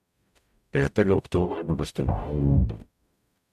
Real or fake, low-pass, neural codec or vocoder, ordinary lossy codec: fake; 14.4 kHz; codec, 44.1 kHz, 0.9 kbps, DAC; none